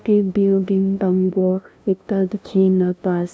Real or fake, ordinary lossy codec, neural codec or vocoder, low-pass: fake; none; codec, 16 kHz, 0.5 kbps, FunCodec, trained on LibriTTS, 25 frames a second; none